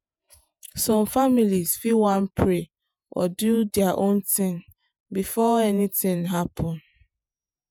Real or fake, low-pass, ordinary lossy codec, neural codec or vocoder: fake; none; none; vocoder, 48 kHz, 128 mel bands, Vocos